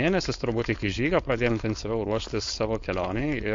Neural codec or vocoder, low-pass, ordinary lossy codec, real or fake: codec, 16 kHz, 4.8 kbps, FACodec; 7.2 kHz; MP3, 48 kbps; fake